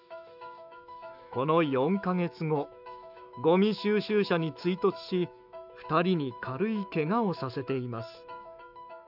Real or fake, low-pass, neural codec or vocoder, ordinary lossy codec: fake; 5.4 kHz; autoencoder, 48 kHz, 128 numbers a frame, DAC-VAE, trained on Japanese speech; none